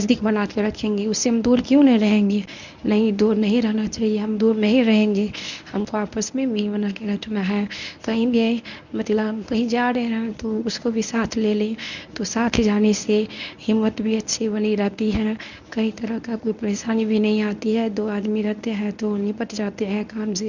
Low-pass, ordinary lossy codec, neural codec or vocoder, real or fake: 7.2 kHz; none; codec, 24 kHz, 0.9 kbps, WavTokenizer, medium speech release version 1; fake